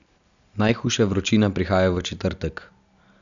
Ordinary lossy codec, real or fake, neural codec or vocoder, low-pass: none; real; none; 7.2 kHz